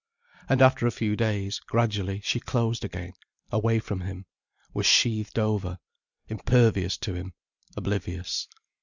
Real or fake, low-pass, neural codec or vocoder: real; 7.2 kHz; none